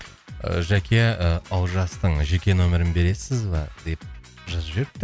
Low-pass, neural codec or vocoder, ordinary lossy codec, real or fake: none; none; none; real